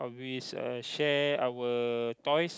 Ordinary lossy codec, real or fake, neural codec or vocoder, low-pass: none; real; none; none